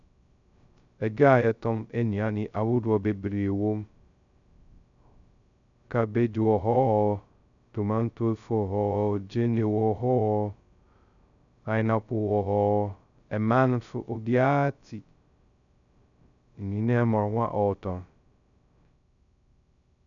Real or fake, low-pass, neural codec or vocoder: fake; 7.2 kHz; codec, 16 kHz, 0.2 kbps, FocalCodec